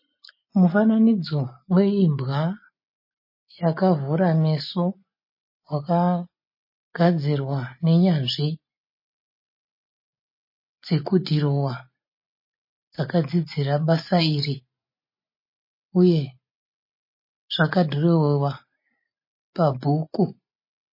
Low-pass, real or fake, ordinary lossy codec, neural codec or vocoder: 5.4 kHz; real; MP3, 24 kbps; none